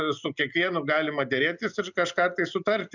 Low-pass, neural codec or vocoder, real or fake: 7.2 kHz; none; real